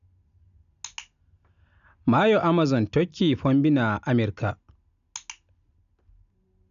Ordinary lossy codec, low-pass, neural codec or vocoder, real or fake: none; 7.2 kHz; none; real